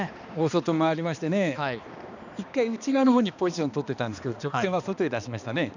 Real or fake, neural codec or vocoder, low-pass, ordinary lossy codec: fake; codec, 16 kHz, 2 kbps, X-Codec, HuBERT features, trained on balanced general audio; 7.2 kHz; none